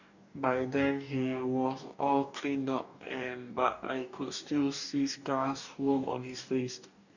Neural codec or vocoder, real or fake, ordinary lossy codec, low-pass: codec, 44.1 kHz, 2.6 kbps, DAC; fake; none; 7.2 kHz